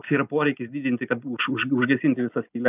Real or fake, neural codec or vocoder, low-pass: real; none; 3.6 kHz